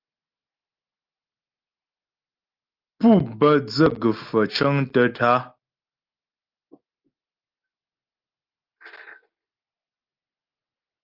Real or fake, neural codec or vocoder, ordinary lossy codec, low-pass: real; none; Opus, 32 kbps; 5.4 kHz